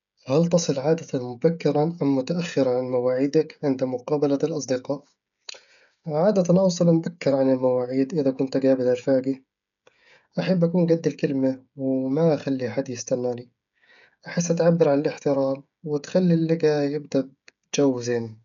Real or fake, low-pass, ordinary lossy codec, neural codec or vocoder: fake; 7.2 kHz; none; codec, 16 kHz, 16 kbps, FreqCodec, smaller model